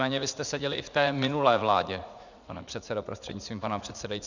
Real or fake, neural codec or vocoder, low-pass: fake; vocoder, 44.1 kHz, 80 mel bands, Vocos; 7.2 kHz